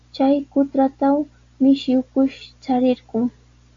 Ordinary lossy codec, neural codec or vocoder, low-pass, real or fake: AAC, 48 kbps; none; 7.2 kHz; real